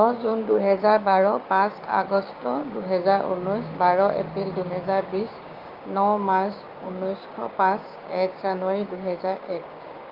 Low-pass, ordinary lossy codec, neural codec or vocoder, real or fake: 5.4 kHz; Opus, 24 kbps; codec, 16 kHz, 6 kbps, DAC; fake